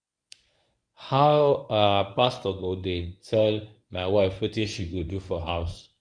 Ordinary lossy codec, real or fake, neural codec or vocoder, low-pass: none; fake; codec, 24 kHz, 0.9 kbps, WavTokenizer, medium speech release version 1; 9.9 kHz